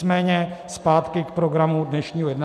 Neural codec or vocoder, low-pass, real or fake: none; 14.4 kHz; real